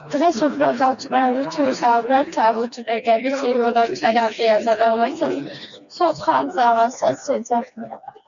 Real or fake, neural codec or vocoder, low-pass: fake; codec, 16 kHz, 2 kbps, FreqCodec, smaller model; 7.2 kHz